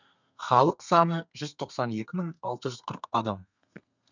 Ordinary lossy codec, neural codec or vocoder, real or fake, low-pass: none; codec, 32 kHz, 1.9 kbps, SNAC; fake; 7.2 kHz